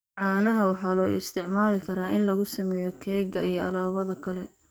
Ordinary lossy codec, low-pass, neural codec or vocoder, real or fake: none; none; codec, 44.1 kHz, 2.6 kbps, SNAC; fake